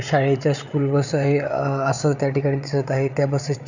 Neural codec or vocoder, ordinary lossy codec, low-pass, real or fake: codec, 16 kHz, 8 kbps, FreqCodec, larger model; none; 7.2 kHz; fake